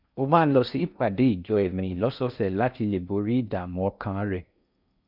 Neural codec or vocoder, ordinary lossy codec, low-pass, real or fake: codec, 16 kHz in and 24 kHz out, 0.6 kbps, FocalCodec, streaming, 4096 codes; none; 5.4 kHz; fake